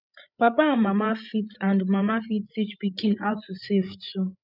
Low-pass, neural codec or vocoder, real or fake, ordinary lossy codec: 5.4 kHz; codec, 16 kHz, 8 kbps, FreqCodec, larger model; fake; none